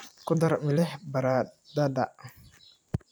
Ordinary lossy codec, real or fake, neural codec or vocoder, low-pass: none; fake; vocoder, 44.1 kHz, 128 mel bands every 256 samples, BigVGAN v2; none